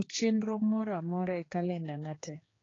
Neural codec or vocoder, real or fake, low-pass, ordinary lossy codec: codec, 16 kHz, 2 kbps, X-Codec, HuBERT features, trained on general audio; fake; 7.2 kHz; AAC, 32 kbps